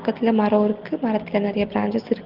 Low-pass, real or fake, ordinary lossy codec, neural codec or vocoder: 5.4 kHz; real; Opus, 16 kbps; none